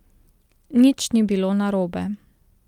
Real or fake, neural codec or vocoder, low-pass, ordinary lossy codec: real; none; 19.8 kHz; Opus, 32 kbps